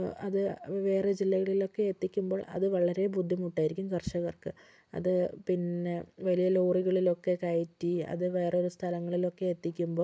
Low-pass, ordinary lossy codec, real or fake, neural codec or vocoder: none; none; real; none